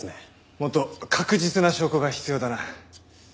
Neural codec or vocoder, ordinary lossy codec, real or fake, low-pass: none; none; real; none